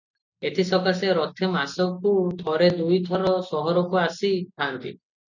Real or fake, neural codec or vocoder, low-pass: real; none; 7.2 kHz